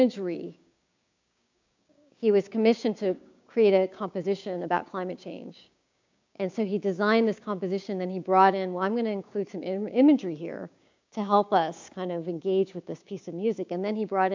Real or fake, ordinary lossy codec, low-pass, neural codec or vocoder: fake; MP3, 64 kbps; 7.2 kHz; codec, 16 kHz, 6 kbps, DAC